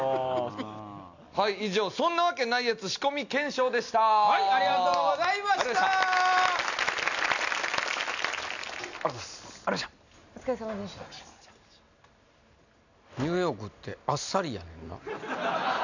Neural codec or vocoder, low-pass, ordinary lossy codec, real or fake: none; 7.2 kHz; none; real